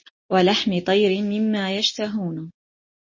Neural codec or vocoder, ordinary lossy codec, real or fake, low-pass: none; MP3, 32 kbps; real; 7.2 kHz